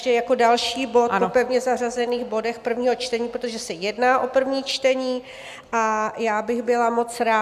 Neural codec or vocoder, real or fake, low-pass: none; real; 14.4 kHz